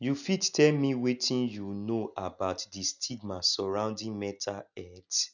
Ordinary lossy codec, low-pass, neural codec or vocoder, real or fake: none; 7.2 kHz; none; real